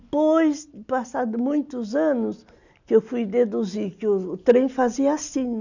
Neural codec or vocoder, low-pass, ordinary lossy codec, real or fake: none; 7.2 kHz; none; real